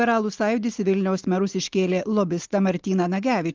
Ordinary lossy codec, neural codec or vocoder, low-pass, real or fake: Opus, 16 kbps; none; 7.2 kHz; real